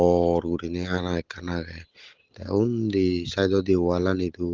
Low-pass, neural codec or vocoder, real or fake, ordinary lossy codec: 7.2 kHz; none; real; Opus, 16 kbps